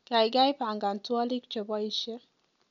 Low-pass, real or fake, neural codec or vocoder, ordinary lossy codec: 7.2 kHz; real; none; none